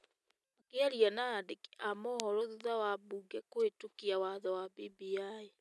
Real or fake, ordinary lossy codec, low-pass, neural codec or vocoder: real; none; none; none